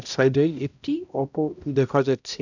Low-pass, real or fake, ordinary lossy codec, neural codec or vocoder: 7.2 kHz; fake; none; codec, 16 kHz, 0.5 kbps, X-Codec, HuBERT features, trained on balanced general audio